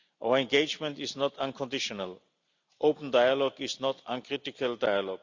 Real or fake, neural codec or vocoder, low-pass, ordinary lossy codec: real; none; 7.2 kHz; Opus, 64 kbps